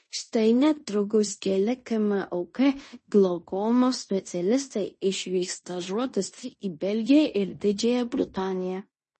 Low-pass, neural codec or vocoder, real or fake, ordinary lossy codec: 10.8 kHz; codec, 16 kHz in and 24 kHz out, 0.9 kbps, LongCat-Audio-Codec, fine tuned four codebook decoder; fake; MP3, 32 kbps